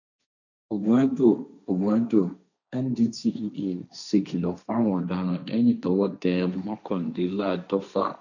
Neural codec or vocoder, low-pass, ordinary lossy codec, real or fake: codec, 16 kHz, 1.1 kbps, Voila-Tokenizer; 7.2 kHz; none; fake